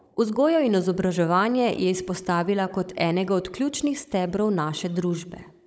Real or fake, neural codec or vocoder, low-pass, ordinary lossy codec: fake; codec, 16 kHz, 16 kbps, FunCodec, trained on Chinese and English, 50 frames a second; none; none